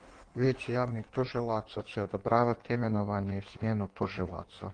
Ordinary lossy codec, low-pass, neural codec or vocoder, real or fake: Opus, 32 kbps; 9.9 kHz; codec, 16 kHz in and 24 kHz out, 1.1 kbps, FireRedTTS-2 codec; fake